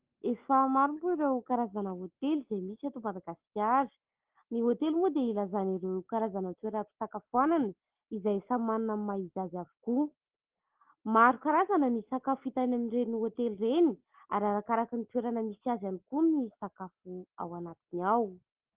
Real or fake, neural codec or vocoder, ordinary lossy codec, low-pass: real; none; Opus, 16 kbps; 3.6 kHz